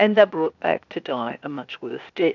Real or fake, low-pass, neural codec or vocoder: fake; 7.2 kHz; codec, 16 kHz, 0.8 kbps, ZipCodec